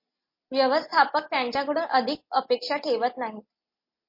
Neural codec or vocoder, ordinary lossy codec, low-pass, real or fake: none; MP3, 32 kbps; 5.4 kHz; real